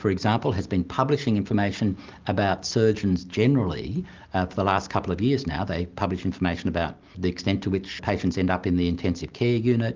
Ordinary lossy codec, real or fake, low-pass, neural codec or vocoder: Opus, 24 kbps; real; 7.2 kHz; none